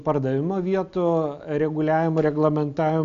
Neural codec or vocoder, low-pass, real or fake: none; 7.2 kHz; real